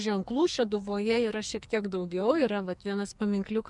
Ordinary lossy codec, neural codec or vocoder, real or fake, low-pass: MP3, 96 kbps; codec, 44.1 kHz, 2.6 kbps, SNAC; fake; 10.8 kHz